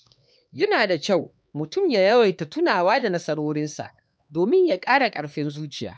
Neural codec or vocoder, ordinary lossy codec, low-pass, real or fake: codec, 16 kHz, 2 kbps, X-Codec, HuBERT features, trained on LibriSpeech; none; none; fake